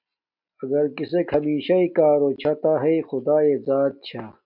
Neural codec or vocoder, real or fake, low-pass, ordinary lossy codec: none; real; 5.4 kHz; MP3, 48 kbps